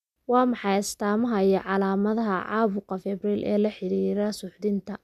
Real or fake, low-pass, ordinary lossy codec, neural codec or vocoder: real; 14.4 kHz; none; none